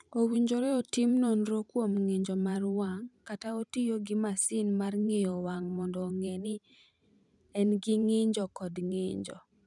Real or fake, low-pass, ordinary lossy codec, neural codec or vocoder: fake; 10.8 kHz; none; vocoder, 44.1 kHz, 128 mel bands every 512 samples, BigVGAN v2